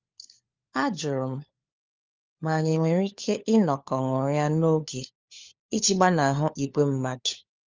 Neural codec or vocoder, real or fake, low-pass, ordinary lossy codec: codec, 16 kHz, 4 kbps, FunCodec, trained on LibriTTS, 50 frames a second; fake; 7.2 kHz; Opus, 32 kbps